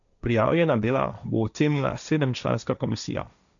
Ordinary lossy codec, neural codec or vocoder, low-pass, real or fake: none; codec, 16 kHz, 1.1 kbps, Voila-Tokenizer; 7.2 kHz; fake